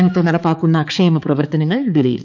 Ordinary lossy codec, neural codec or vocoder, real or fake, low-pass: none; codec, 16 kHz, 4 kbps, X-Codec, HuBERT features, trained on balanced general audio; fake; 7.2 kHz